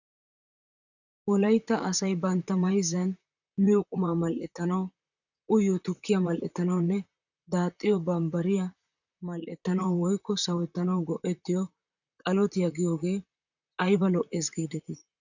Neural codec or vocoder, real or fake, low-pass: vocoder, 44.1 kHz, 128 mel bands, Pupu-Vocoder; fake; 7.2 kHz